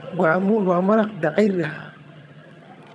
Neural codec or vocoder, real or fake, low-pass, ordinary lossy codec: vocoder, 22.05 kHz, 80 mel bands, HiFi-GAN; fake; none; none